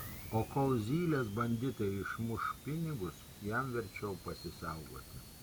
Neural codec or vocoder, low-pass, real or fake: none; 19.8 kHz; real